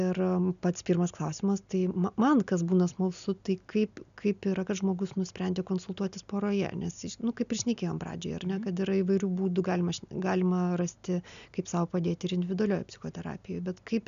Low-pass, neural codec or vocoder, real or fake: 7.2 kHz; none; real